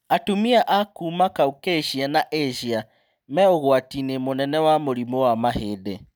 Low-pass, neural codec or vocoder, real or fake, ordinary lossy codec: none; none; real; none